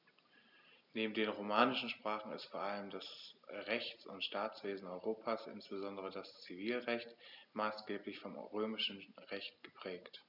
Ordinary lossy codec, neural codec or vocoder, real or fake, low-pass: none; none; real; 5.4 kHz